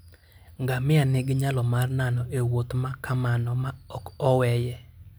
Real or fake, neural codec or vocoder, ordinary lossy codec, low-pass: real; none; none; none